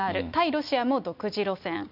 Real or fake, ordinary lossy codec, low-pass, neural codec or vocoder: real; none; 5.4 kHz; none